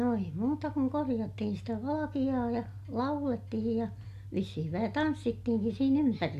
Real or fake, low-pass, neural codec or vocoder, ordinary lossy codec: real; 14.4 kHz; none; AAC, 96 kbps